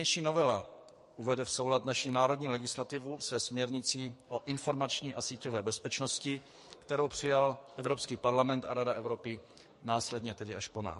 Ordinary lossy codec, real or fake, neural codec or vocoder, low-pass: MP3, 48 kbps; fake; codec, 44.1 kHz, 2.6 kbps, SNAC; 14.4 kHz